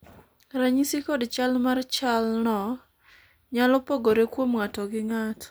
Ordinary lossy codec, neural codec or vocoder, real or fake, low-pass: none; none; real; none